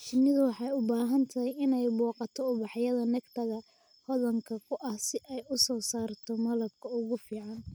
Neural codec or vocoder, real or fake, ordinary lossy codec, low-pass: none; real; none; none